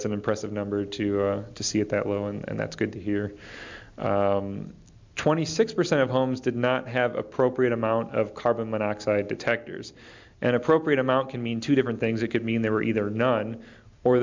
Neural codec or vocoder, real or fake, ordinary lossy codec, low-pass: none; real; MP3, 64 kbps; 7.2 kHz